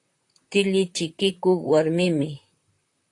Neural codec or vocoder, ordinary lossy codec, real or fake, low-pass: vocoder, 44.1 kHz, 128 mel bands, Pupu-Vocoder; AAC, 48 kbps; fake; 10.8 kHz